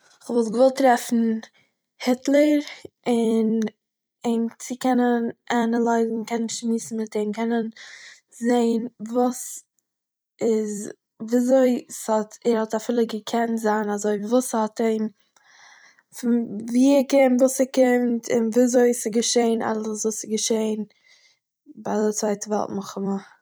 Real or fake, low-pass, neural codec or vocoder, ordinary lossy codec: fake; none; vocoder, 44.1 kHz, 128 mel bands every 256 samples, BigVGAN v2; none